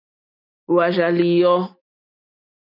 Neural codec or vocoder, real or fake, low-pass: none; real; 5.4 kHz